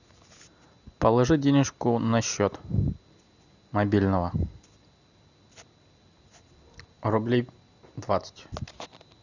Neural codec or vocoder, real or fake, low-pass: none; real; 7.2 kHz